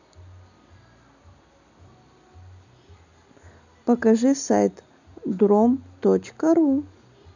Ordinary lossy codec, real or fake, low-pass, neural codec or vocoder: none; real; 7.2 kHz; none